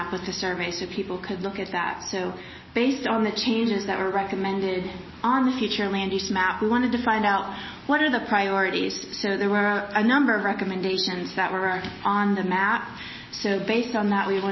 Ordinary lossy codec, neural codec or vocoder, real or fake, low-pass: MP3, 24 kbps; none; real; 7.2 kHz